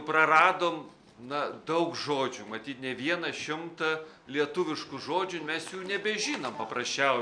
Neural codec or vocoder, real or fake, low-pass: none; real; 9.9 kHz